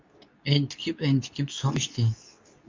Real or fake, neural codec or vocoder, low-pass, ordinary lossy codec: fake; codec, 24 kHz, 0.9 kbps, WavTokenizer, medium speech release version 2; 7.2 kHz; MP3, 64 kbps